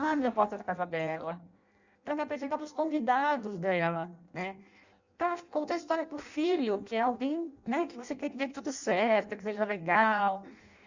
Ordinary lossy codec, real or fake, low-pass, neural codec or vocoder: Opus, 64 kbps; fake; 7.2 kHz; codec, 16 kHz in and 24 kHz out, 0.6 kbps, FireRedTTS-2 codec